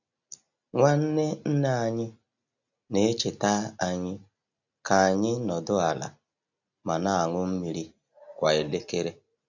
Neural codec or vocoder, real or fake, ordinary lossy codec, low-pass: none; real; none; 7.2 kHz